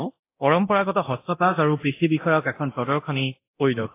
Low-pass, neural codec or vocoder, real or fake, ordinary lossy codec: 3.6 kHz; codec, 24 kHz, 0.9 kbps, DualCodec; fake; AAC, 24 kbps